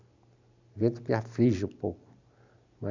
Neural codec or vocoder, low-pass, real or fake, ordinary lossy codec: none; 7.2 kHz; real; MP3, 64 kbps